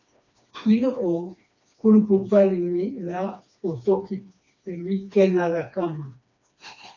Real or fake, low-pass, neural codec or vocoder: fake; 7.2 kHz; codec, 16 kHz, 2 kbps, FreqCodec, smaller model